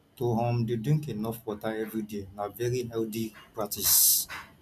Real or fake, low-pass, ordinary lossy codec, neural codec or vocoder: real; 14.4 kHz; none; none